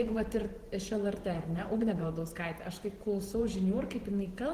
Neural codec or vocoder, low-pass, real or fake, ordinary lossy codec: vocoder, 44.1 kHz, 128 mel bands every 512 samples, BigVGAN v2; 14.4 kHz; fake; Opus, 16 kbps